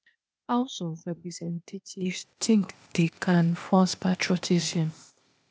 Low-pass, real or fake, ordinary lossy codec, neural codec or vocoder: none; fake; none; codec, 16 kHz, 0.8 kbps, ZipCodec